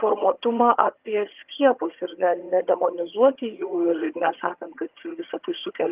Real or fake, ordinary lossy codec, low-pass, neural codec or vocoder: fake; Opus, 32 kbps; 3.6 kHz; vocoder, 22.05 kHz, 80 mel bands, HiFi-GAN